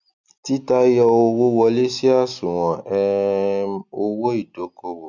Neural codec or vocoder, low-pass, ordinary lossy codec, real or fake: none; 7.2 kHz; none; real